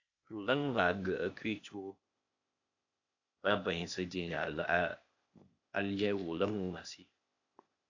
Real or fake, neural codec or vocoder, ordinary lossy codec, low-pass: fake; codec, 16 kHz, 0.8 kbps, ZipCodec; AAC, 48 kbps; 7.2 kHz